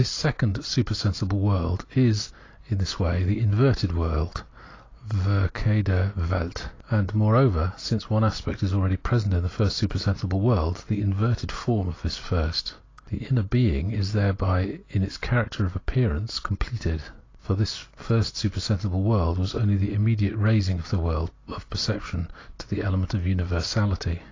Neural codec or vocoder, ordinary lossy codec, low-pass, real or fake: none; AAC, 32 kbps; 7.2 kHz; real